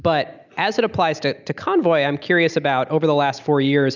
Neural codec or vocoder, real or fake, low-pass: none; real; 7.2 kHz